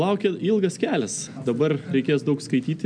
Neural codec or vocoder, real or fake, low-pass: none; real; 9.9 kHz